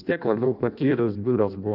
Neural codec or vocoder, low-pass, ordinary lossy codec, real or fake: codec, 16 kHz in and 24 kHz out, 0.6 kbps, FireRedTTS-2 codec; 5.4 kHz; Opus, 24 kbps; fake